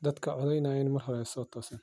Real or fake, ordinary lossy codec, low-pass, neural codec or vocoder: real; none; none; none